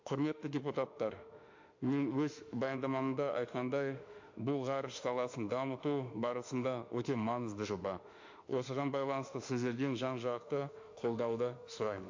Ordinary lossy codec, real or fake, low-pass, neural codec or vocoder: MP3, 48 kbps; fake; 7.2 kHz; autoencoder, 48 kHz, 32 numbers a frame, DAC-VAE, trained on Japanese speech